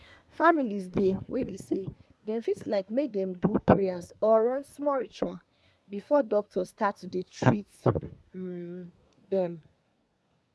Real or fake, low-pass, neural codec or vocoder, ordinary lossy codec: fake; none; codec, 24 kHz, 1 kbps, SNAC; none